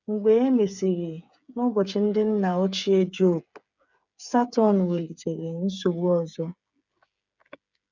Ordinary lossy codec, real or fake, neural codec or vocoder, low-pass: none; fake; codec, 16 kHz, 8 kbps, FreqCodec, smaller model; 7.2 kHz